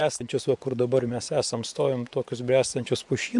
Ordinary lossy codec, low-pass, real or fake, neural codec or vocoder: MP3, 64 kbps; 10.8 kHz; fake; vocoder, 24 kHz, 100 mel bands, Vocos